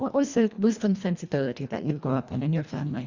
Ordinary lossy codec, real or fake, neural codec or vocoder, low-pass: Opus, 64 kbps; fake; codec, 24 kHz, 1.5 kbps, HILCodec; 7.2 kHz